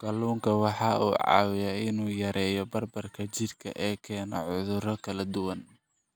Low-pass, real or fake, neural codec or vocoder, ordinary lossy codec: none; real; none; none